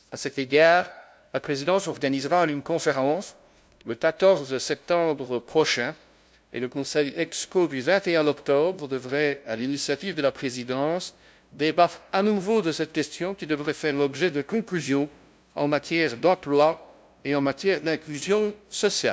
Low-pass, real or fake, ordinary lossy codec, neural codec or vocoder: none; fake; none; codec, 16 kHz, 0.5 kbps, FunCodec, trained on LibriTTS, 25 frames a second